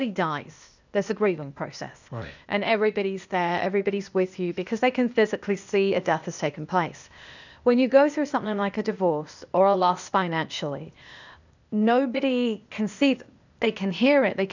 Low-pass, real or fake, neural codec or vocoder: 7.2 kHz; fake; codec, 16 kHz, 0.8 kbps, ZipCodec